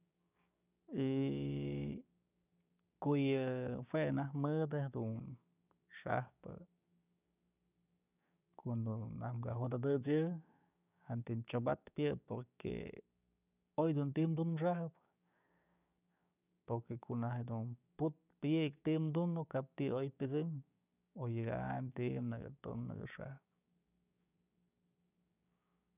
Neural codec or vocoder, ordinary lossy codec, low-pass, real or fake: codec, 16 kHz, 6 kbps, DAC; none; 3.6 kHz; fake